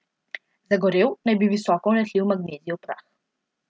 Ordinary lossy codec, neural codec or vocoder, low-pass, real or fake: none; none; none; real